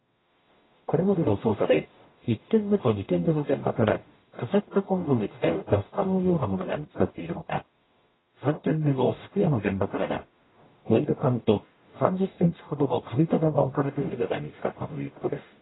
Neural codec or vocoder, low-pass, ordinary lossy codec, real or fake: codec, 44.1 kHz, 0.9 kbps, DAC; 7.2 kHz; AAC, 16 kbps; fake